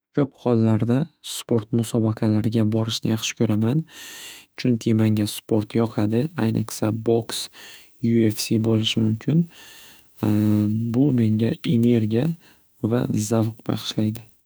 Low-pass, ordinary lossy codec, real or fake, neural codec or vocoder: none; none; fake; autoencoder, 48 kHz, 32 numbers a frame, DAC-VAE, trained on Japanese speech